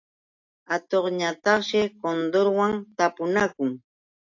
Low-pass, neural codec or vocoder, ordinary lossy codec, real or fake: 7.2 kHz; none; AAC, 48 kbps; real